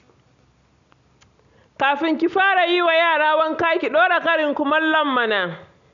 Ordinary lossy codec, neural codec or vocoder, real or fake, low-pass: none; none; real; 7.2 kHz